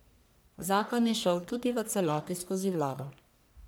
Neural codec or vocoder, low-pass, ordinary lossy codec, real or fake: codec, 44.1 kHz, 1.7 kbps, Pupu-Codec; none; none; fake